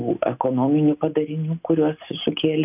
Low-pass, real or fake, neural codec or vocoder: 3.6 kHz; real; none